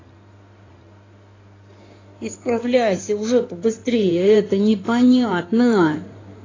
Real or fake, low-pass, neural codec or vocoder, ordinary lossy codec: fake; 7.2 kHz; codec, 16 kHz in and 24 kHz out, 2.2 kbps, FireRedTTS-2 codec; AAC, 32 kbps